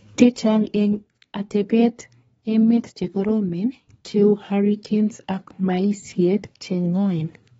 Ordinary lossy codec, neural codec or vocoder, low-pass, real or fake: AAC, 24 kbps; codec, 24 kHz, 1 kbps, SNAC; 10.8 kHz; fake